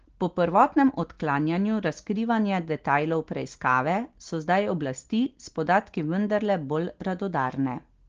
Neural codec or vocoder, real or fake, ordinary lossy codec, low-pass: none; real; Opus, 16 kbps; 7.2 kHz